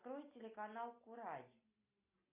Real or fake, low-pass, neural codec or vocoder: real; 3.6 kHz; none